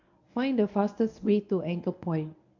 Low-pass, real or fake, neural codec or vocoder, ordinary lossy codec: 7.2 kHz; fake; codec, 24 kHz, 0.9 kbps, WavTokenizer, medium speech release version 1; none